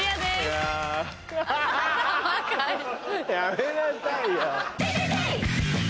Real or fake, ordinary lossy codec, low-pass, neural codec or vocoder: real; none; none; none